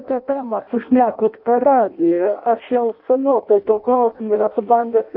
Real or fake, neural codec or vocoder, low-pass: fake; codec, 16 kHz in and 24 kHz out, 0.6 kbps, FireRedTTS-2 codec; 5.4 kHz